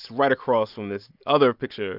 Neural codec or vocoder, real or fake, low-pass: none; real; 5.4 kHz